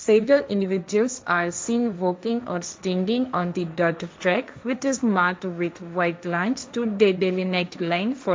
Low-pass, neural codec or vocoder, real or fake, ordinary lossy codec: none; codec, 16 kHz, 1.1 kbps, Voila-Tokenizer; fake; none